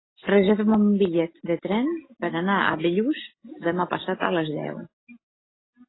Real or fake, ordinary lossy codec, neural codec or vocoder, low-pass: real; AAC, 16 kbps; none; 7.2 kHz